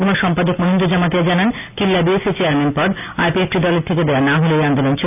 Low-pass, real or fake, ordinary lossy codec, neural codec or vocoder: 3.6 kHz; real; none; none